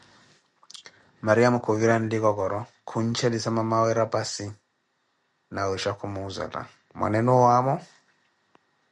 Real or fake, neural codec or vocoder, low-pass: real; none; 10.8 kHz